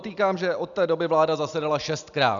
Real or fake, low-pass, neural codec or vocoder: real; 7.2 kHz; none